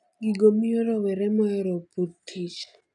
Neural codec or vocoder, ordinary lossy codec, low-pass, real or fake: none; none; 10.8 kHz; real